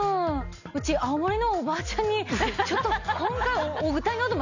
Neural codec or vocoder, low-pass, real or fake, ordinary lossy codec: none; 7.2 kHz; real; none